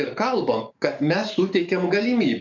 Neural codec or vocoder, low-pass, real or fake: codec, 44.1 kHz, 7.8 kbps, DAC; 7.2 kHz; fake